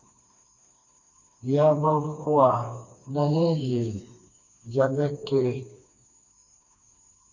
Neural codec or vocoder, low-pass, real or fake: codec, 16 kHz, 2 kbps, FreqCodec, smaller model; 7.2 kHz; fake